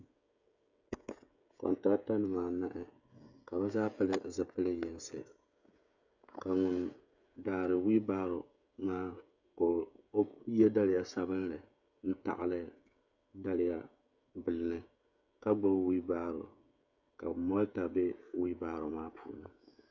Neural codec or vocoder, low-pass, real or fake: codec, 16 kHz, 16 kbps, FreqCodec, smaller model; 7.2 kHz; fake